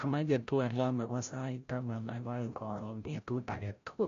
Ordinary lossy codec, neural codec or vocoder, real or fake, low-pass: MP3, 48 kbps; codec, 16 kHz, 0.5 kbps, FreqCodec, larger model; fake; 7.2 kHz